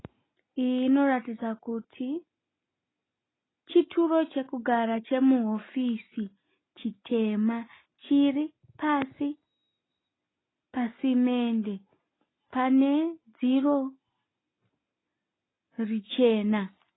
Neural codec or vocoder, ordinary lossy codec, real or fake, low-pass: none; AAC, 16 kbps; real; 7.2 kHz